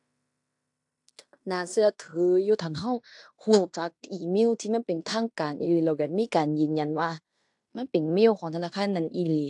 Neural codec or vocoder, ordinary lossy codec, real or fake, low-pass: codec, 16 kHz in and 24 kHz out, 0.9 kbps, LongCat-Audio-Codec, fine tuned four codebook decoder; AAC, 64 kbps; fake; 10.8 kHz